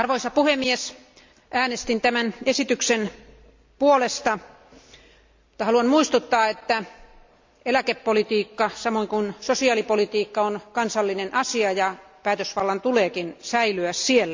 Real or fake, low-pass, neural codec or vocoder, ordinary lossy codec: real; 7.2 kHz; none; MP3, 64 kbps